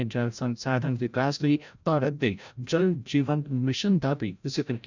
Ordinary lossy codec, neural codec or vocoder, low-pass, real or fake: none; codec, 16 kHz, 0.5 kbps, FreqCodec, larger model; 7.2 kHz; fake